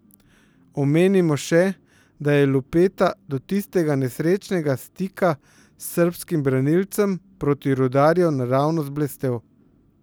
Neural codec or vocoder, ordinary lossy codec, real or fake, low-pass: none; none; real; none